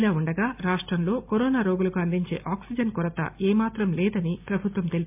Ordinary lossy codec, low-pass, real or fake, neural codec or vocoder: MP3, 32 kbps; 3.6 kHz; real; none